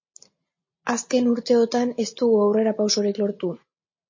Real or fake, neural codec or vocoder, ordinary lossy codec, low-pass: real; none; MP3, 32 kbps; 7.2 kHz